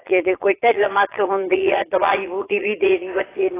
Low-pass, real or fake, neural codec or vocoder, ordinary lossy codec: 3.6 kHz; fake; vocoder, 22.05 kHz, 80 mel bands, Vocos; AAC, 16 kbps